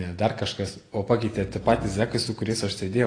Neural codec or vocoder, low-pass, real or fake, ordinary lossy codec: none; 9.9 kHz; real; AAC, 32 kbps